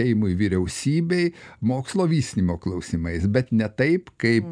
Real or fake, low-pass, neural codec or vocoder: real; 9.9 kHz; none